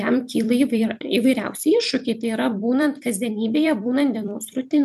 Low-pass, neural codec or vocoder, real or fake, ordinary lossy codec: 14.4 kHz; vocoder, 44.1 kHz, 128 mel bands every 256 samples, BigVGAN v2; fake; MP3, 96 kbps